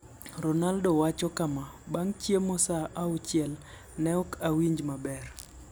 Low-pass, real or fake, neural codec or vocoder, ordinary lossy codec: none; real; none; none